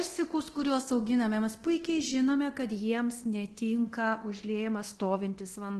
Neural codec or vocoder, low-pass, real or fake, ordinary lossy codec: codec, 24 kHz, 0.9 kbps, DualCodec; 10.8 kHz; fake; Opus, 16 kbps